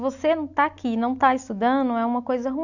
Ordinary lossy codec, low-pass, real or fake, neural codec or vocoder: none; 7.2 kHz; real; none